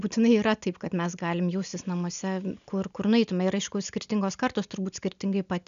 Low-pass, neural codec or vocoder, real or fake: 7.2 kHz; none; real